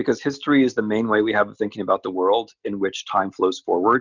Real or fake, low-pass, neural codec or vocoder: real; 7.2 kHz; none